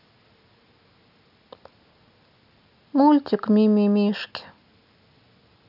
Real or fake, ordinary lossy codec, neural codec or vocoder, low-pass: real; none; none; 5.4 kHz